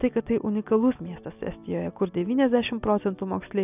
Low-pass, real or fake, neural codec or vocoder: 3.6 kHz; real; none